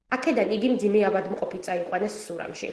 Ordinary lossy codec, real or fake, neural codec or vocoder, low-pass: Opus, 16 kbps; real; none; 10.8 kHz